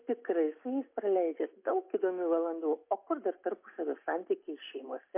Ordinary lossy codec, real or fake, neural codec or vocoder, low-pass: MP3, 24 kbps; real; none; 3.6 kHz